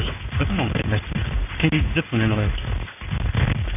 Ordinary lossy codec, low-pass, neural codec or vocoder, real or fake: none; 3.6 kHz; codec, 16 kHz in and 24 kHz out, 1 kbps, XY-Tokenizer; fake